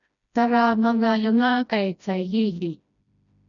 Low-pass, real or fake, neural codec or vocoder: 7.2 kHz; fake; codec, 16 kHz, 1 kbps, FreqCodec, smaller model